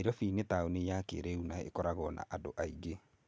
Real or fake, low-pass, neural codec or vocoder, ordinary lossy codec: real; none; none; none